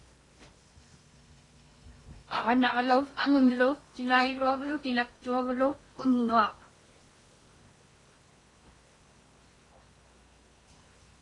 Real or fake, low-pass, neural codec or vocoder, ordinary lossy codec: fake; 10.8 kHz; codec, 16 kHz in and 24 kHz out, 0.8 kbps, FocalCodec, streaming, 65536 codes; AAC, 32 kbps